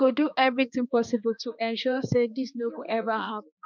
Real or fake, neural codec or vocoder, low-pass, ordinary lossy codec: fake; codec, 16 kHz, 2 kbps, X-Codec, HuBERT features, trained on balanced general audio; 7.2 kHz; none